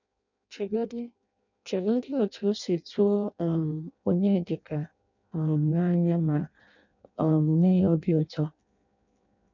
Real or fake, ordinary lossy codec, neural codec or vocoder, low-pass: fake; none; codec, 16 kHz in and 24 kHz out, 0.6 kbps, FireRedTTS-2 codec; 7.2 kHz